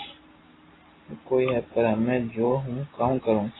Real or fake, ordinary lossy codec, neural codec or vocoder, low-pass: real; AAC, 16 kbps; none; 7.2 kHz